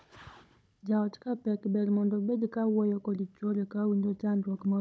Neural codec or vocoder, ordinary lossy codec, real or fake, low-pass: codec, 16 kHz, 16 kbps, FunCodec, trained on Chinese and English, 50 frames a second; none; fake; none